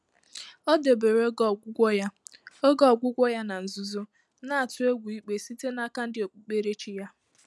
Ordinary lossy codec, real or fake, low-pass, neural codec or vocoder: none; real; none; none